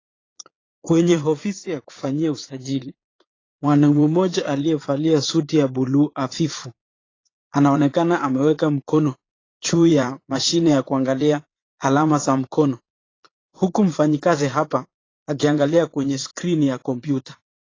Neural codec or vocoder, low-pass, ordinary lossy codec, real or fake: vocoder, 22.05 kHz, 80 mel bands, Vocos; 7.2 kHz; AAC, 32 kbps; fake